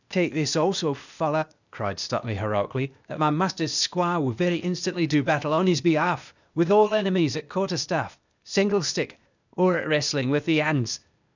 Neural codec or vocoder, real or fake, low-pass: codec, 16 kHz, 0.8 kbps, ZipCodec; fake; 7.2 kHz